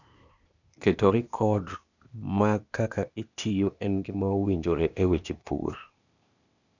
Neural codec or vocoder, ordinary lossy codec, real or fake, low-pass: codec, 16 kHz, 0.8 kbps, ZipCodec; none; fake; 7.2 kHz